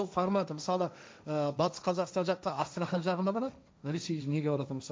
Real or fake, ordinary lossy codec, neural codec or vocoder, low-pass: fake; none; codec, 16 kHz, 1.1 kbps, Voila-Tokenizer; none